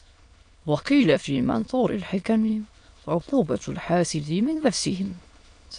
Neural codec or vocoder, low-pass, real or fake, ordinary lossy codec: autoencoder, 22.05 kHz, a latent of 192 numbers a frame, VITS, trained on many speakers; 9.9 kHz; fake; AAC, 64 kbps